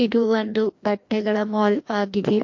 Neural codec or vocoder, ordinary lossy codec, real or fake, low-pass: codec, 16 kHz, 1 kbps, FreqCodec, larger model; MP3, 48 kbps; fake; 7.2 kHz